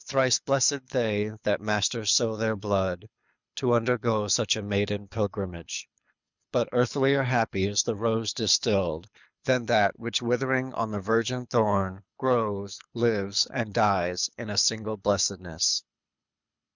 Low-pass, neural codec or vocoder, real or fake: 7.2 kHz; codec, 24 kHz, 6 kbps, HILCodec; fake